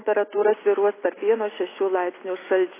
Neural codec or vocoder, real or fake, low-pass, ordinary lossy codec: none; real; 3.6 kHz; AAC, 16 kbps